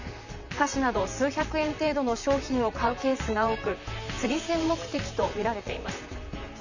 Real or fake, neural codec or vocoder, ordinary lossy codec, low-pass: fake; vocoder, 44.1 kHz, 128 mel bands, Pupu-Vocoder; none; 7.2 kHz